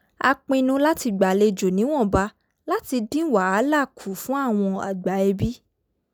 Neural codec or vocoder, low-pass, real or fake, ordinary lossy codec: none; none; real; none